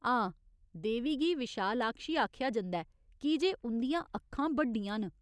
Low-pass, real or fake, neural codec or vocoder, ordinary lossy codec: none; real; none; none